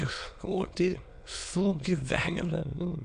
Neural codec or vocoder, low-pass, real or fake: autoencoder, 22.05 kHz, a latent of 192 numbers a frame, VITS, trained on many speakers; 9.9 kHz; fake